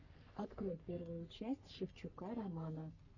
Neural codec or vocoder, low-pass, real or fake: codec, 44.1 kHz, 3.4 kbps, Pupu-Codec; 7.2 kHz; fake